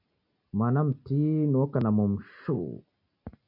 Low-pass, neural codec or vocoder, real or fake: 5.4 kHz; none; real